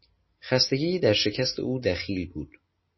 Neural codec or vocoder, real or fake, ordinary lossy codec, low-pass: none; real; MP3, 24 kbps; 7.2 kHz